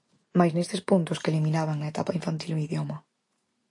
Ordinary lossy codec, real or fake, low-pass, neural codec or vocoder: AAC, 48 kbps; real; 10.8 kHz; none